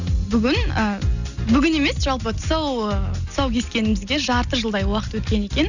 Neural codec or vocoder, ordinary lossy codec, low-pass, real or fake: none; none; 7.2 kHz; real